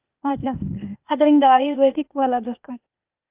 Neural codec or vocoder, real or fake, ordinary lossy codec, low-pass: codec, 16 kHz, 0.8 kbps, ZipCodec; fake; Opus, 24 kbps; 3.6 kHz